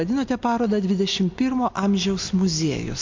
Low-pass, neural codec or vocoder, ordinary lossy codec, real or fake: 7.2 kHz; none; MP3, 48 kbps; real